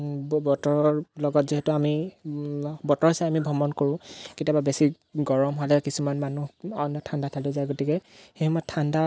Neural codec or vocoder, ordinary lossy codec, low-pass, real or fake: none; none; none; real